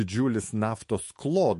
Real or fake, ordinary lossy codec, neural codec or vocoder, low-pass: fake; MP3, 48 kbps; autoencoder, 48 kHz, 128 numbers a frame, DAC-VAE, trained on Japanese speech; 14.4 kHz